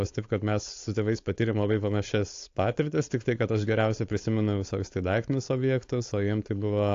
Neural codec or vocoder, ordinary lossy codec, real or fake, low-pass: codec, 16 kHz, 4.8 kbps, FACodec; AAC, 48 kbps; fake; 7.2 kHz